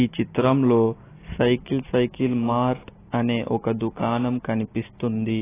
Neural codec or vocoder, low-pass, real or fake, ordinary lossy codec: none; 3.6 kHz; real; AAC, 16 kbps